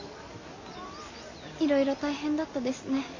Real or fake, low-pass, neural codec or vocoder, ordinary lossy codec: real; 7.2 kHz; none; AAC, 48 kbps